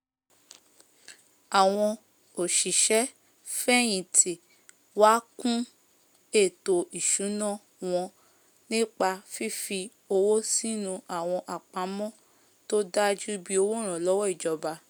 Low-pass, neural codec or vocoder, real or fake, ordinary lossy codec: none; none; real; none